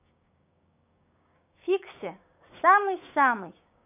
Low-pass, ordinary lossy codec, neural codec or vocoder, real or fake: 3.6 kHz; none; codec, 16 kHz, 6 kbps, DAC; fake